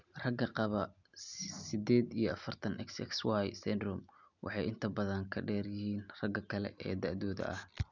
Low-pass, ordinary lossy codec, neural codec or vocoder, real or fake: 7.2 kHz; none; none; real